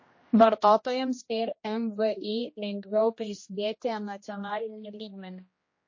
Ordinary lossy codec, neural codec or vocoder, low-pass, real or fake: MP3, 32 kbps; codec, 16 kHz, 1 kbps, X-Codec, HuBERT features, trained on general audio; 7.2 kHz; fake